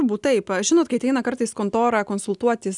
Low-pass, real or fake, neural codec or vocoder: 10.8 kHz; real; none